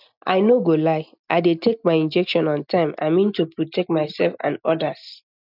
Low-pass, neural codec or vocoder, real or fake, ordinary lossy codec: 5.4 kHz; none; real; none